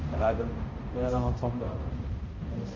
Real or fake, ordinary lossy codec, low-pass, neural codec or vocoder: fake; Opus, 32 kbps; 7.2 kHz; codec, 16 kHz, 0.5 kbps, X-Codec, HuBERT features, trained on balanced general audio